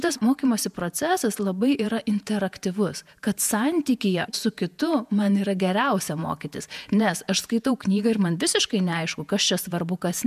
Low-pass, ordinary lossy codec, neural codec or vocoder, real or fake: 14.4 kHz; MP3, 96 kbps; vocoder, 48 kHz, 128 mel bands, Vocos; fake